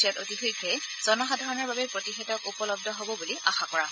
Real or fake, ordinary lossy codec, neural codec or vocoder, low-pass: real; none; none; none